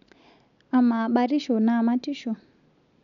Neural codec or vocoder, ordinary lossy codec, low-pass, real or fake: none; none; 7.2 kHz; real